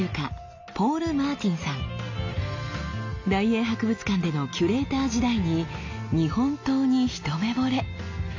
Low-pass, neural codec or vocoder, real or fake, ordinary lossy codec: 7.2 kHz; none; real; AAC, 48 kbps